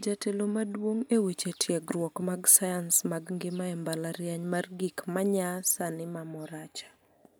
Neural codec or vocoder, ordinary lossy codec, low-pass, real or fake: none; none; none; real